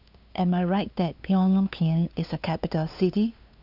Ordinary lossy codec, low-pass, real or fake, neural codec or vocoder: none; 5.4 kHz; fake; codec, 16 kHz, 2 kbps, FunCodec, trained on LibriTTS, 25 frames a second